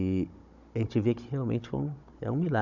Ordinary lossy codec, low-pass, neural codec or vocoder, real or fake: none; 7.2 kHz; codec, 16 kHz, 16 kbps, FunCodec, trained on Chinese and English, 50 frames a second; fake